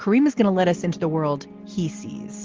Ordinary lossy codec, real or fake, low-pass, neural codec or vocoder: Opus, 16 kbps; real; 7.2 kHz; none